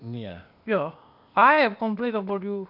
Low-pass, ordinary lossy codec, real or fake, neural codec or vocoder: 5.4 kHz; none; fake; codec, 16 kHz, 0.8 kbps, ZipCodec